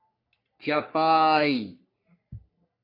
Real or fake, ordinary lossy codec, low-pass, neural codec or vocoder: fake; MP3, 48 kbps; 5.4 kHz; codec, 44.1 kHz, 3.4 kbps, Pupu-Codec